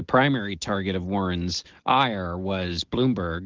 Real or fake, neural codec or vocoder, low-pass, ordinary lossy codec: real; none; 7.2 kHz; Opus, 16 kbps